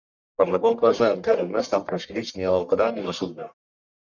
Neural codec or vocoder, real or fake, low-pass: codec, 44.1 kHz, 1.7 kbps, Pupu-Codec; fake; 7.2 kHz